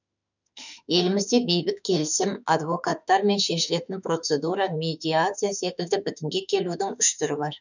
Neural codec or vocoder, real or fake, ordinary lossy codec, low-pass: autoencoder, 48 kHz, 32 numbers a frame, DAC-VAE, trained on Japanese speech; fake; none; 7.2 kHz